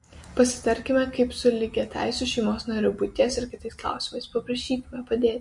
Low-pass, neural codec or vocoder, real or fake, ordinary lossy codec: 10.8 kHz; none; real; MP3, 48 kbps